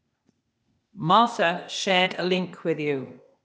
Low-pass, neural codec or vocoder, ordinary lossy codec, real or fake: none; codec, 16 kHz, 0.8 kbps, ZipCodec; none; fake